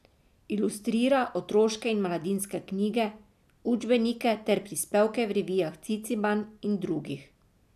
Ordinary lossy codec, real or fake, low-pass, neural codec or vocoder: none; real; 14.4 kHz; none